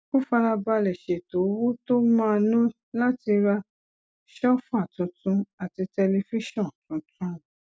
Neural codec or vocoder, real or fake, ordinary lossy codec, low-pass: none; real; none; none